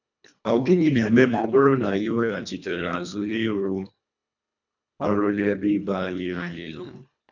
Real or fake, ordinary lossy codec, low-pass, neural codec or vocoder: fake; none; 7.2 kHz; codec, 24 kHz, 1.5 kbps, HILCodec